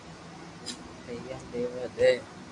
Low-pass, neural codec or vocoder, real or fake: 10.8 kHz; none; real